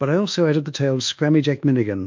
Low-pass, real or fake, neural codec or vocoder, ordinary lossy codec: 7.2 kHz; fake; codec, 24 kHz, 1.2 kbps, DualCodec; MP3, 64 kbps